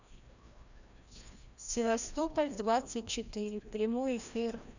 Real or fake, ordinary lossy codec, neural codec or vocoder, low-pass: fake; none; codec, 16 kHz, 1 kbps, FreqCodec, larger model; 7.2 kHz